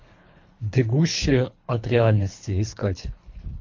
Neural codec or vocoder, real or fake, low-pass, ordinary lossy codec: codec, 24 kHz, 3 kbps, HILCodec; fake; 7.2 kHz; MP3, 48 kbps